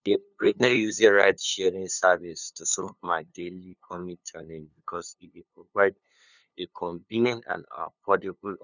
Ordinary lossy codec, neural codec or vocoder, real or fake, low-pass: none; codec, 16 kHz, 2 kbps, FunCodec, trained on LibriTTS, 25 frames a second; fake; 7.2 kHz